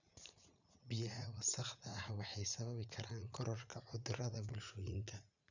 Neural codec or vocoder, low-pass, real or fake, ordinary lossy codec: vocoder, 44.1 kHz, 80 mel bands, Vocos; 7.2 kHz; fake; none